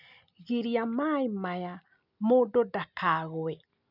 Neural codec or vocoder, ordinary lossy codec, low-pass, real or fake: none; none; 5.4 kHz; real